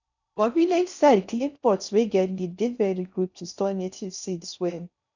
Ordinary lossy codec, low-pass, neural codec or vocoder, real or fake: none; 7.2 kHz; codec, 16 kHz in and 24 kHz out, 0.6 kbps, FocalCodec, streaming, 4096 codes; fake